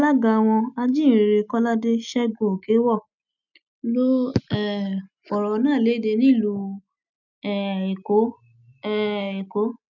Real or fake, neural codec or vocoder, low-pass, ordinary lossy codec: real; none; 7.2 kHz; none